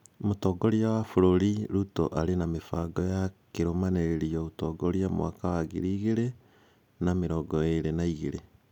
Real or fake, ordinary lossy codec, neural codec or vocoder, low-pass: real; none; none; 19.8 kHz